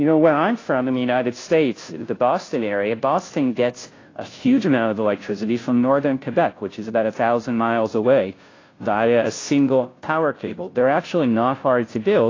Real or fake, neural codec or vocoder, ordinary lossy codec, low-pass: fake; codec, 16 kHz, 0.5 kbps, FunCodec, trained on Chinese and English, 25 frames a second; AAC, 32 kbps; 7.2 kHz